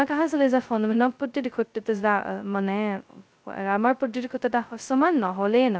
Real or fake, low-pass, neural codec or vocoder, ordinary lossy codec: fake; none; codec, 16 kHz, 0.2 kbps, FocalCodec; none